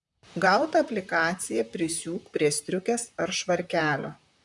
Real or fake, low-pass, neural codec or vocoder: fake; 10.8 kHz; vocoder, 44.1 kHz, 128 mel bands, Pupu-Vocoder